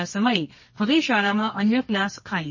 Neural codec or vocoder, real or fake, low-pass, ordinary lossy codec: codec, 24 kHz, 0.9 kbps, WavTokenizer, medium music audio release; fake; 7.2 kHz; MP3, 32 kbps